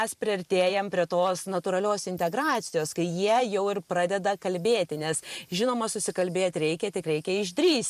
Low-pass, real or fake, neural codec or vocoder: 14.4 kHz; fake; vocoder, 44.1 kHz, 128 mel bands every 512 samples, BigVGAN v2